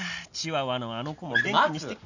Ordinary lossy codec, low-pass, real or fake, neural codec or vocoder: none; 7.2 kHz; real; none